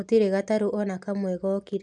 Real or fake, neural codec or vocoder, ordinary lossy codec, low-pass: real; none; none; 9.9 kHz